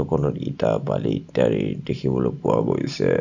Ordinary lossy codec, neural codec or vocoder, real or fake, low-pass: none; none; real; 7.2 kHz